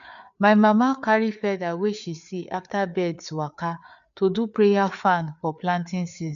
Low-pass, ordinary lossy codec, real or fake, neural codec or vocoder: 7.2 kHz; none; fake; codec, 16 kHz, 4 kbps, FreqCodec, larger model